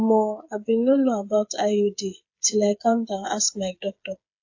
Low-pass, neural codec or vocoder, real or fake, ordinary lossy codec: 7.2 kHz; codec, 16 kHz, 8 kbps, FreqCodec, smaller model; fake; AAC, 48 kbps